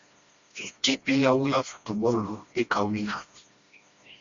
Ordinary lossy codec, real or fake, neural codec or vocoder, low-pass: Opus, 64 kbps; fake; codec, 16 kHz, 1 kbps, FreqCodec, smaller model; 7.2 kHz